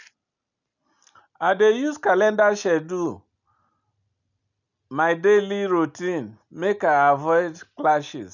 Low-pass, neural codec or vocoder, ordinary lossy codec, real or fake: 7.2 kHz; none; none; real